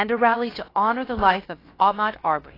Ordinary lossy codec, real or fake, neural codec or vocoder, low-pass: AAC, 24 kbps; fake; codec, 16 kHz, about 1 kbps, DyCAST, with the encoder's durations; 5.4 kHz